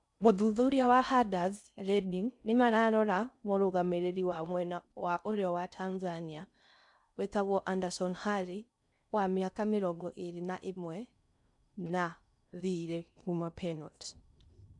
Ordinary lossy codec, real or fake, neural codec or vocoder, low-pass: none; fake; codec, 16 kHz in and 24 kHz out, 0.6 kbps, FocalCodec, streaming, 4096 codes; 10.8 kHz